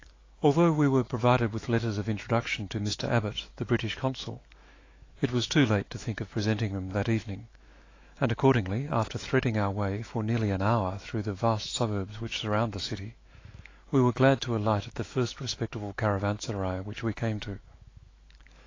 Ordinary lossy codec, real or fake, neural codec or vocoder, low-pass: AAC, 32 kbps; fake; autoencoder, 48 kHz, 128 numbers a frame, DAC-VAE, trained on Japanese speech; 7.2 kHz